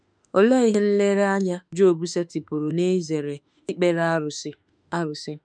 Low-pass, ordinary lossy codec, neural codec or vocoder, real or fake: 9.9 kHz; none; autoencoder, 48 kHz, 32 numbers a frame, DAC-VAE, trained on Japanese speech; fake